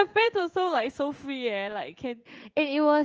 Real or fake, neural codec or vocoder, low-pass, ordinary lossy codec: fake; codec, 16 kHz in and 24 kHz out, 1 kbps, XY-Tokenizer; 7.2 kHz; Opus, 24 kbps